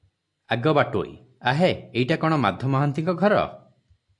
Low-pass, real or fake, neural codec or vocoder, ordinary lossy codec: 10.8 kHz; real; none; AAC, 64 kbps